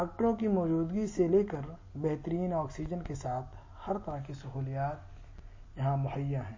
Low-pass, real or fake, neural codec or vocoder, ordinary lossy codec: 7.2 kHz; real; none; MP3, 32 kbps